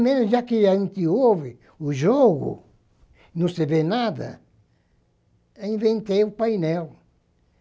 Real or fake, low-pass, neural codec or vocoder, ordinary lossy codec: real; none; none; none